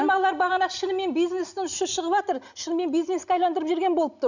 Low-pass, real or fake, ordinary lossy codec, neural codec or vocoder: 7.2 kHz; real; none; none